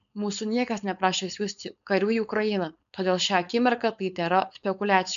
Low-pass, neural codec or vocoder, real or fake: 7.2 kHz; codec, 16 kHz, 4.8 kbps, FACodec; fake